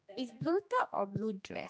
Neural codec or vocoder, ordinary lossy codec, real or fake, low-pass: codec, 16 kHz, 1 kbps, X-Codec, HuBERT features, trained on general audio; none; fake; none